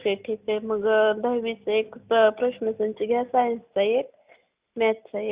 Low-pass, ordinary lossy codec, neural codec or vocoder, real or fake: 3.6 kHz; Opus, 64 kbps; none; real